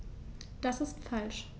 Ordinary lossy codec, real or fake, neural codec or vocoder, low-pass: none; real; none; none